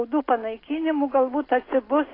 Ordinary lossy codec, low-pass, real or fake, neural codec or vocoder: AAC, 24 kbps; 5.4 kHz; real; none